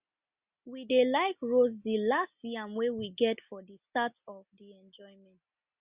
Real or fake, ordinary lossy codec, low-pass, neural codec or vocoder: real; Opus, 64 kbps; 3.6 kHz; none